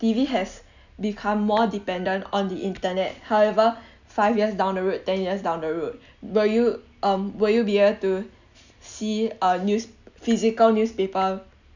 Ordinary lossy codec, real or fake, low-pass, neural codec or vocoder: none; real; 7.2 kHz; none